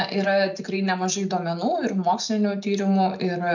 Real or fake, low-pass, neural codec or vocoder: real; 7.2 kHz; none